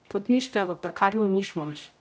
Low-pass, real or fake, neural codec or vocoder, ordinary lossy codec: none; fake; codec, 16 kHz, 0.5 kbps, X-Codec, HuBERT features, trained on general audio; none